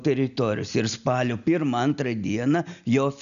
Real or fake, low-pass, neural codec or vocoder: real; 7.2 kHz; none